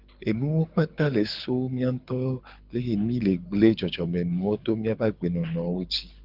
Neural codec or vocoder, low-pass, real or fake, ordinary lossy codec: codec, 24 kHz, 6 kbps, HILCodec; 5.4 kHz; fake; Opus, 24 kbps